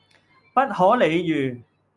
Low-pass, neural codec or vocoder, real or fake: 10.8 kHz; none; real